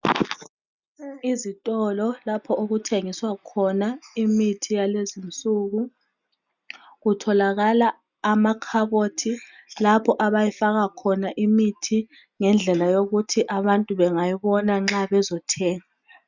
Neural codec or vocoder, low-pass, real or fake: none; 7.2 kHz; real